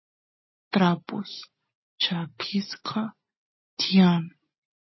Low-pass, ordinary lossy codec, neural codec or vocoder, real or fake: 7.2 kHz; MP3, 24 kbps; none; real